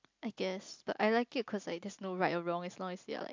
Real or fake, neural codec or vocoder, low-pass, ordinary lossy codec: real; none; 7.2 kHz; MP3, 64 kbps